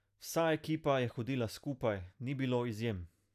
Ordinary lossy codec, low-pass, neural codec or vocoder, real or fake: none; 14.4 kHz; none; real